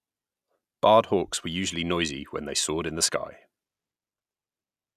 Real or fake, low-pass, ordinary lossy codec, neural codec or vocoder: real; 14.4 kHz; none; none